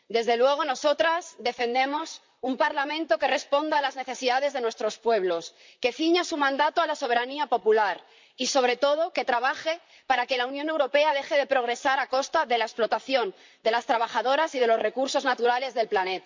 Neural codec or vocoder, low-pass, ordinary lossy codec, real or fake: vocoder, 44.1 kHz, 128 mel bands, Pupu-Vocoder; 7.2 kHz; MP3, 64 kbps; fake